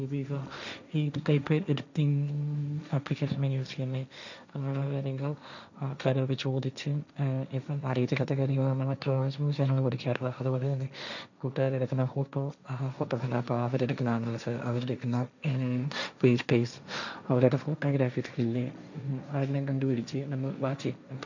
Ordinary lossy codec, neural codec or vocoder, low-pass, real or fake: none; codec, 16 kHz, 1.1 kbps, Voila-Tokenizer; 7.2 kHz; fake